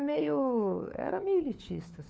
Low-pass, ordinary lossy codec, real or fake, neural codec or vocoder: none; none; fake; codec, 16 kHz, 4 kbps, FunCodec, trained on LibriTTS, 50 frames a second